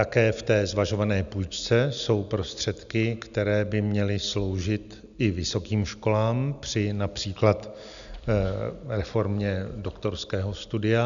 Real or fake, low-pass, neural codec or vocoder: real; 7.2 kHz; none